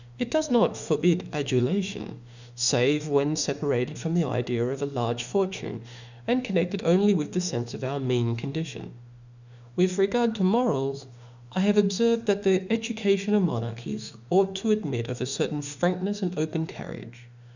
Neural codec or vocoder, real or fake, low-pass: autoencoder, 48 kHz, 32 numbers a frame, DAC-VAE, trained on Japanese speech; fake; 7.2 kHz